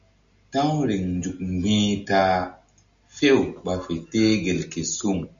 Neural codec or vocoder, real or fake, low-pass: none; real; 7.2 kHz